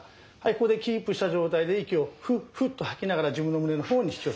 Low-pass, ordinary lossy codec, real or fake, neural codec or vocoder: none; none; real; none